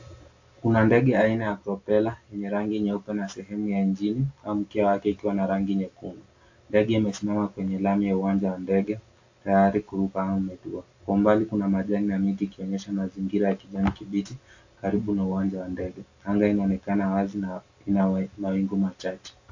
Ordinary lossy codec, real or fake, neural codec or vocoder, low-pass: AAC, 48 kbps; real; none; 7.2 kHz